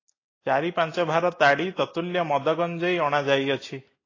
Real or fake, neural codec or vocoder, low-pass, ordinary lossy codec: real; none; 7.2 kHz; AAC, 32 kbps